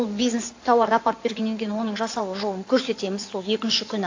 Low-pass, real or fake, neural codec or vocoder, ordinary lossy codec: 7.2 kHz; fake; codec, 16 kHz in and 24 kHz out, 2.2 kbps, FireRedTTS-2 codec; AAC, 48 kbps